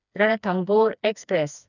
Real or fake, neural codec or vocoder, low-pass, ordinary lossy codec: fake; codec, 16 kHz, 2 kbps, FreqCodec, smaller model; 7.2 kHz; none